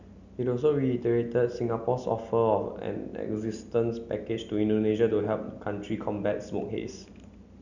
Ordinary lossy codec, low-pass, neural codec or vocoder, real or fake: none; 7.2 kHz; none; real